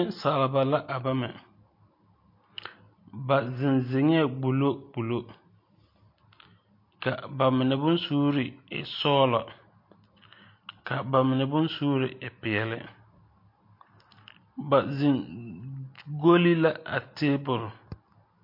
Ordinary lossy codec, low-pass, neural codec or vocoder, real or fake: MP3, 32 kbps; 5.4 kHz; none; real